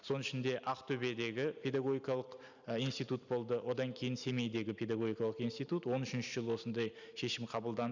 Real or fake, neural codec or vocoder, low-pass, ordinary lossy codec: real; none; 7.2 kHz; none